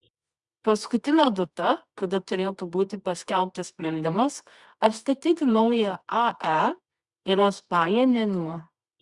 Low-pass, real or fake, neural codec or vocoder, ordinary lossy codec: 10.8 kHz; fake; codec, 24 kHz, 0.9 kbps, WavTokenizer, medium music audio release; Opus, 64 kbps